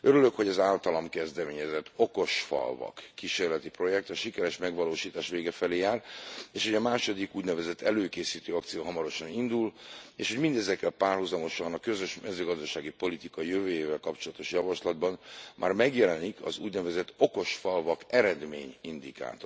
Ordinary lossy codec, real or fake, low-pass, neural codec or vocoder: none; real; none; none